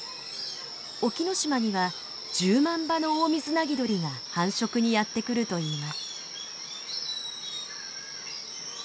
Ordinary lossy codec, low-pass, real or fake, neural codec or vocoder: none; none; real; none